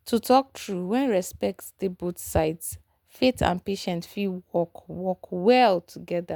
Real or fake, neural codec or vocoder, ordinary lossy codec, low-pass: real; none; none; none